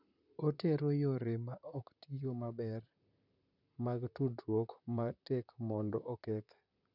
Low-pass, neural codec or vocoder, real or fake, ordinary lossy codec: 5.4 kHz; vocoder, 44.1 kHz, 128 mel bands, Pupu-Vocoder; fake; none